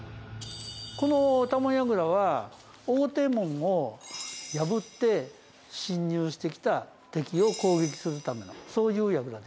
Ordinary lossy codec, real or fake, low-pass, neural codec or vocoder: none; real; none; none